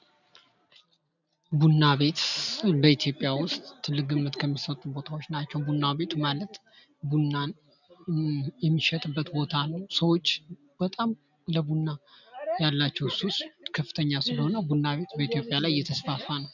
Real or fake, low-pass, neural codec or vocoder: real; 7.2 kHz; none